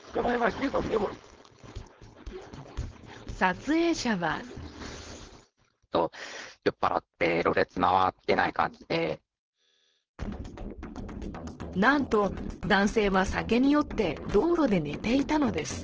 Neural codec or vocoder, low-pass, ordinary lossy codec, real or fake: codec, 16 kHz, 4.8 kbps, FACodec; 7.2 kHz; Opus, 16 kbps; fake